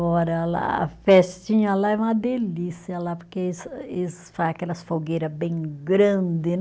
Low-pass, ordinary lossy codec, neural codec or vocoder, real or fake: none; none; none; real